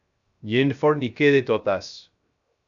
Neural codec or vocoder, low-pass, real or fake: codec, 16 kHz, 0.3 kbps, FocalCodec; 7.2 kHz; fake